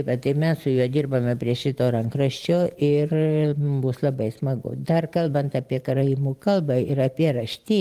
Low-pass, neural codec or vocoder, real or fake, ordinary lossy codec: 14.4 kHz; vocoder, 44.1 kHz, 128 mel bands every 512 samples, BigVGAN v2; fake; Opus, 24 kbps